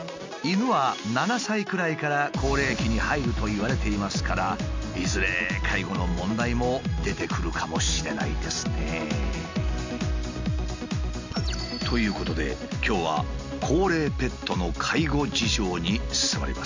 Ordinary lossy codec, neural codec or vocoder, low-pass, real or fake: MP3, 48 kbps; none; 7.2 kHz; real